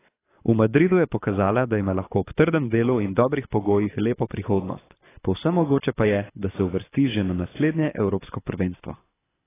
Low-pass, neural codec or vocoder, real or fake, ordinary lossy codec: 3.6 kHz; codec, 24 kHz, 6 kbps, HILCodec; fake; AAC, 16 kbps